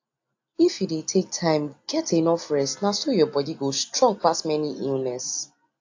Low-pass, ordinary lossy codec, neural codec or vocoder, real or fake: 7.2 kHz; AAC, 48 kbps; none; real